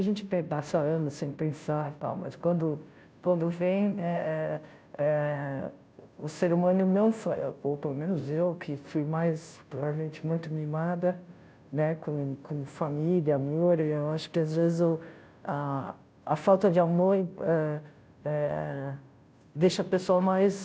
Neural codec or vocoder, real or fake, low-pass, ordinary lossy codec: codec, 16 kHz, 0.5 kbps, FunCodec, trained on Chinese and English, 25 frames a second; fake; none; none